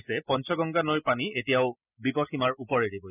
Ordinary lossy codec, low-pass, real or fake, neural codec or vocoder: none; 3.6 kHz; real; none